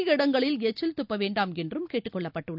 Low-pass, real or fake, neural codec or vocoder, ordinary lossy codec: 5.4 kHz; real; none; none